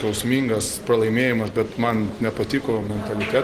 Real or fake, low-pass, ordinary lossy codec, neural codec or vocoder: real; 14.4 kHz; Opus, 16 kbps; none